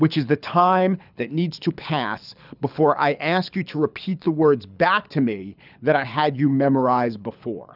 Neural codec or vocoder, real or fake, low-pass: codec, 24 kHz, 6 kbps, HILCodec; fake; 5.4 kHz